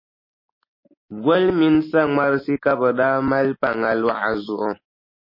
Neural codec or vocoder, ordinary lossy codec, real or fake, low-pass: none; MP3, 24 kbps; real; 5.4 kHz